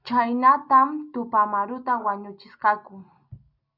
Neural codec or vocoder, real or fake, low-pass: none; real; 5.4 kHz